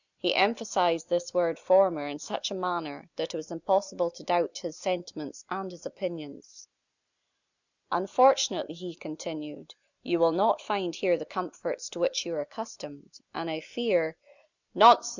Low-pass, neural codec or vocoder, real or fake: 7.2 kHz; none; real